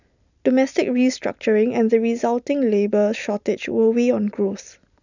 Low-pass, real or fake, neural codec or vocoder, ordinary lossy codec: 7.2 kHz; real; none; none